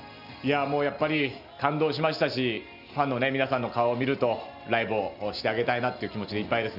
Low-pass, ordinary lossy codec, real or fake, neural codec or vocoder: 5.4 kHz; AAC, 48 kbps; real; none